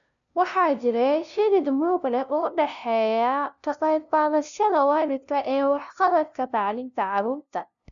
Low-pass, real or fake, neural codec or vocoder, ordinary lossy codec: 7.2 kHz; fake; codec, 16 kHz, 0.5 kbps, FunCodec, trained on LibriTTS, 25 frames a second; none